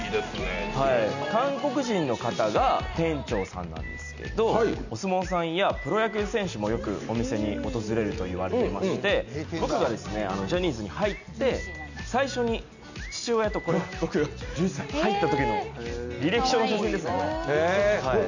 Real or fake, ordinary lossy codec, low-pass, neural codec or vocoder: real; none; 7.2 kHz; none